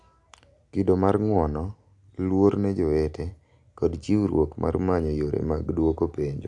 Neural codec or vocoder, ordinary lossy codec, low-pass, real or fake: none; AAC, 64 kbps; 10.8 kHz; real